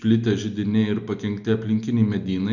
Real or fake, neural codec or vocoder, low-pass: real; none; 7.2 kHz